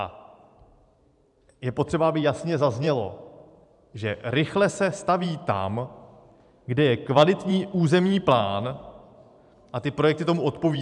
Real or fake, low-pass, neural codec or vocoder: fake; 10.8 kHz; vocoder, 44.1 kHz, 128 mel bands every 512 samples, BigVGAN v2